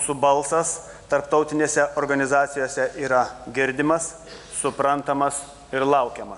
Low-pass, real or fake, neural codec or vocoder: 10.8 kHz; real; none